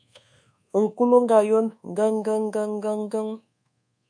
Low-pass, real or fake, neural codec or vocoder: 9.9 kHz; fake; codec, 24 kHz, 1.2 kbps, DualCodec